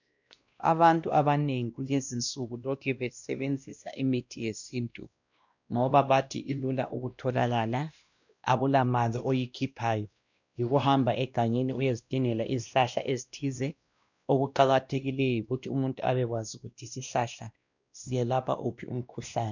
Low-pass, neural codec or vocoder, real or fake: 7.2 kHz; codec, 16 kHz, 1 kbps, X-Codec, WavLM features, trained on Multilingual LibriSpeech; fake